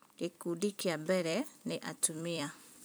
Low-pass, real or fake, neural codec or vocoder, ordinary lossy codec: none; fake; vocoder, 44.1 kHz, 128 mel bands every 256 samples, BigVGAN v2; none